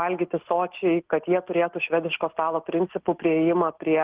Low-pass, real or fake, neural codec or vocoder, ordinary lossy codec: 3.6 kHz; real; none; Opus, 16 kbps